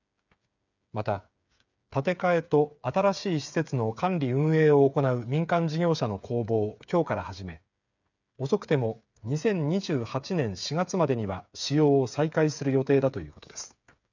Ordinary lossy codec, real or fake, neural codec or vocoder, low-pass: none; fake; codec, 16 kHz, 8 kbps, FreqCodec, smaller model; 7.2 kHz